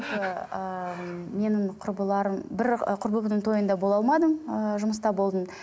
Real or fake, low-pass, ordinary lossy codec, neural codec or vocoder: real; none; none; none